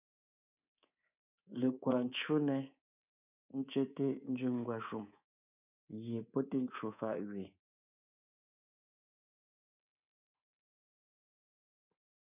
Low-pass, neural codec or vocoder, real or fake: 3.6 kHz; codec, 24 kHz, 3.1 kbps, DualCodec; fake